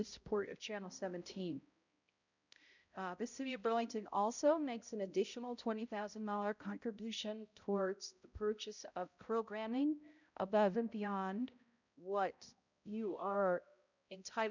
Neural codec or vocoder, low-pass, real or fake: codec, 16 kHz, 0.5 kbps, X-Codec, HuBERT features, trained on balanced general audio; 7.2 kHz; fake